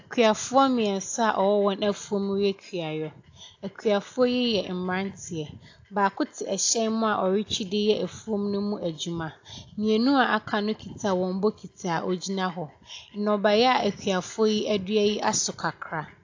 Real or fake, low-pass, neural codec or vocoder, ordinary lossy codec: real; 7.2 kHz; none; AAC, 48 kbps